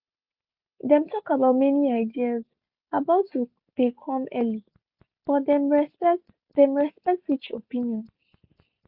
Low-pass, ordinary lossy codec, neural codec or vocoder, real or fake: 5.4 kHz; Opus, 64 kbps; none; real